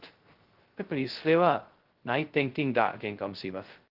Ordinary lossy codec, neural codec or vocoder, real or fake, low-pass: Opus, 16 kbps; codec, 16 kHz, 0.2 kbps, FocalCodec; fake; 5.4 kHz